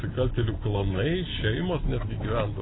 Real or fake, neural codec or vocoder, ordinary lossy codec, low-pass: real; none; AAC, 16 kbps; 7.2 kHz